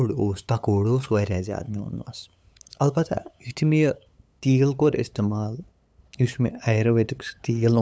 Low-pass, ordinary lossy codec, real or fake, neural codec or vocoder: none; none; fake; codec, 16 kHz, 8 kbps, FunCodec, trained on LibriTTS, 25 frames a second